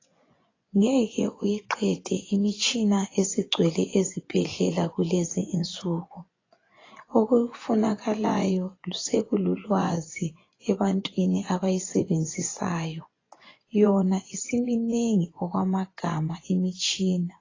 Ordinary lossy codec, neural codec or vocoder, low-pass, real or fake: AAC, 32 kbps; vocoder, 24 kHz, 100 mel bands, Vocos; 7.2 kHz; fake